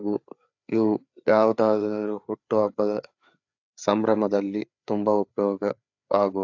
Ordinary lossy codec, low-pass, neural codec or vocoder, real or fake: AAC, 48 kbps; 7.2 kHz; codec, 16 kHz, 4 kbps, FreqCodec, larger model; fake